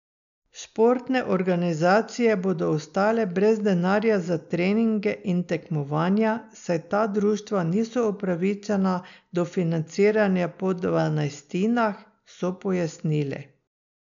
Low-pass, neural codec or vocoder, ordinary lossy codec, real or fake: 7.2 kHz; none; none; real